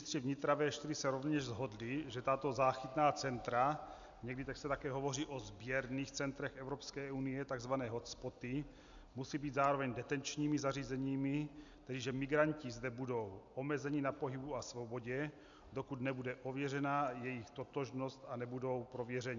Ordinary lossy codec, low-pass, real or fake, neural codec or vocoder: MP3, 96 kbps; 7.2 kHz; real; none